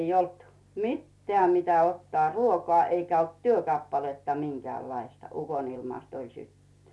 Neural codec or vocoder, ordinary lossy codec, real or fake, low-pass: none; none; real; none